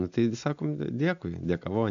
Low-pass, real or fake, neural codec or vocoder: 7.2 kHz; real; none